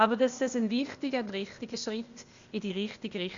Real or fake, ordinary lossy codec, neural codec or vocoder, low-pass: fake; Opus, 64 kbps; codec, 16 kHz, 0.8 kbps, ZipCodec; 7.2 kHz